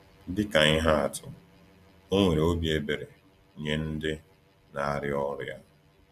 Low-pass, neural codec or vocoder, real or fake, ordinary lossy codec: 14.4 kHz; none; real; none